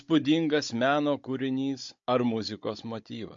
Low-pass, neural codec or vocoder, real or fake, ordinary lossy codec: 7.2 kHz; codec, 16 kHz, 16 kbps, FunCodec, trained on Chinese and English, 50 frames a second; fake; MP3, 48 kbps